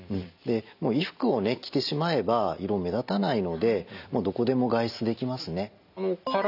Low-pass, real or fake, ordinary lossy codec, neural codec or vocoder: 5.4 kHz; real; none; none